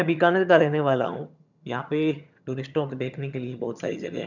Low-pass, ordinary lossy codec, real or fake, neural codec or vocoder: 7.2 kHz; none; fake; vocoder, 22.05 kHz, 80 mel bands, HiFi-GAN